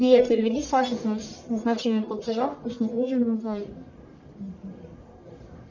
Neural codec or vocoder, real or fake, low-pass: codec, 44.1 kHz, 1.7 kbps, Pupu-Codec; fake; 7.2 kHz